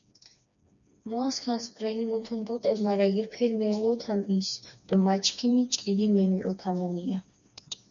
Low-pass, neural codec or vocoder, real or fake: 7.2 kHz; codec, 16 kHz, 2 kbps, FreqCodec, smaller model; fake